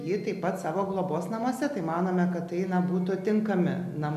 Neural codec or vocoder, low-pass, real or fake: none; 14.4 kHz; real